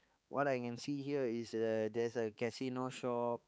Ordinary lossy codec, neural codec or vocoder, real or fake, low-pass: none; codec, 16 kHz, 4 kbps, X-Codec, HuBERT features, trained on balanced general audio; fake; none